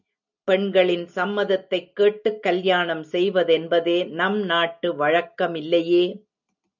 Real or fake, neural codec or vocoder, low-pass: real; none; 7.2 kHz